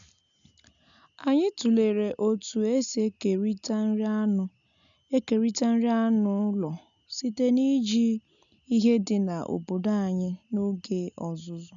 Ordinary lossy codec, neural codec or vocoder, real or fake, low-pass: none; none; real; 7.2 kHz